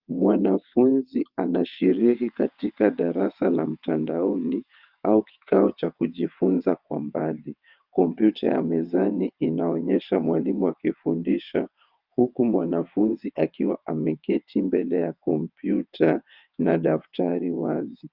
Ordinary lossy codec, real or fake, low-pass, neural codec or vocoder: Opus, 24 kbps; fake; 5.4 kHz; vocoder, 22.05 kHz, 80 mel bands, WaveNeXt